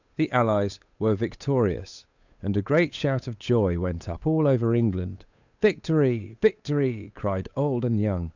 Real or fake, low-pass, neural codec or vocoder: fake; 7.2 kHz; codec, 16 kHz, 8 kbps, FunCodec, trained on Chinese and English, 25 frames a second